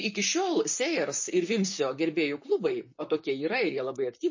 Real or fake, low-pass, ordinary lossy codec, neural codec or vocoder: fake; 7.2 kHz; MP3, 32 kbps; vocoder, 44.1 kHz, 128 mel bands, Pupu-Vocoder